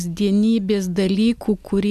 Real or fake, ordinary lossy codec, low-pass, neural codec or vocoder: real; AAC, 96 kbps; 14.4 kHz; none